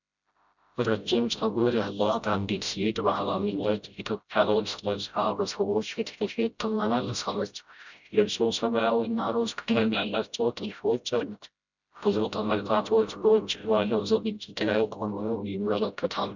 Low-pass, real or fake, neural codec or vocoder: 7.2 kHz; fake; codec, 16 kHz, 0.5 kbps, FreqCodec, smaller model